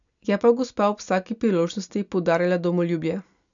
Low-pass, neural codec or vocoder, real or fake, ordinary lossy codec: 7.2 kHz; none; real; none